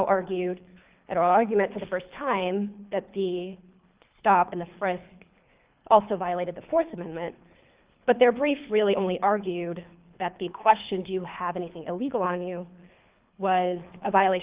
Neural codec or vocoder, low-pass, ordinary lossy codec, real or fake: codec, 24 kHz, 3 kbps, HILCodec; 3.6 kHz; Opus, 24 kbps; fake